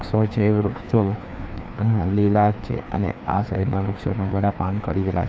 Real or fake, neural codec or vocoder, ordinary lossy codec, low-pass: fake; codec, 16 kHz, 2 kbps, FreqCodec, larger model; none; none